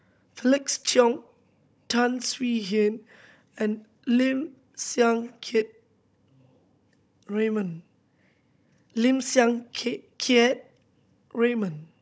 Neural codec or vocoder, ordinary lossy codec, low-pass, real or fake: codec, 16 kHz, 16 kbps, FunCodec, trained on Chinese and English, 50 frames a second; none; none; fake